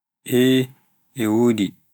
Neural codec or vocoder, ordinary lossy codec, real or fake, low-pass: autoencoder, 48 kHz, 128 numbers a frame, DAC-VAE, trained on Japanese speech; none; fake; none